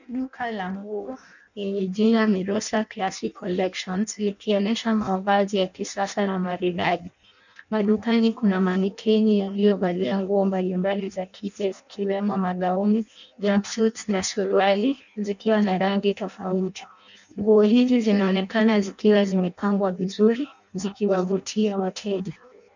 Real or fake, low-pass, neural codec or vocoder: fake; 7.2 kHz; codec, 16 kHz in and 24 kHz out, 0.6 kbps, FireRedTTS-2 codec